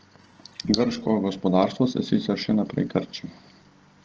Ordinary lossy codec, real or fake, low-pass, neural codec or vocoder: Opus, 16 kbps; real; 7.2 kHz; none